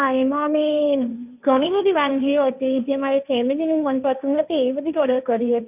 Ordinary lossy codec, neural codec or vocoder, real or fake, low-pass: none; codec, 16 kHz, 1.1 kbps, Voila-Tokenizer; fake; 3.6 kHz